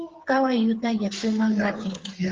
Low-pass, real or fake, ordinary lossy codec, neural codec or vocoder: 7.2 kHz; fake; Opus, 16 kbps; codec, 16 kHz, 8 kbps, FreqCodec, smaller model